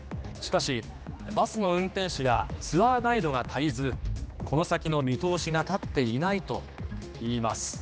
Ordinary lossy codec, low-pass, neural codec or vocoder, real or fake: none; none; codec, 16 kHz, 2 kbps, X-Codec, HuBERT features, trained on general audio; fake